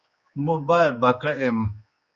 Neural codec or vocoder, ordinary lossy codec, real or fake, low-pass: codec, 16 kHz, 2 kbps, X-Codec, HuBERT features, trained on general audio; Opus, 64 kbps; fake; 7.2 kHz